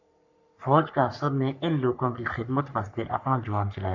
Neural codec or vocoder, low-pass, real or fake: codec, 44.1 kHz, 3.4 kbps, Pupu-Codec; 7.2 kHz; fake